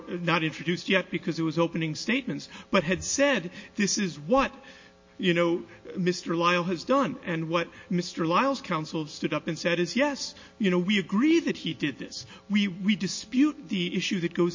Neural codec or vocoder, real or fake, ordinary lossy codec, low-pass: none; real; MP3, 32 kbps; 7.2 kHz